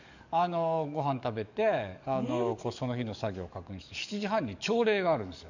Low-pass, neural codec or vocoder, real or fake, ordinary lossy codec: 7.2 kHz; codec, 44.1 kHz, 7.8 kbps, DAC; fake; none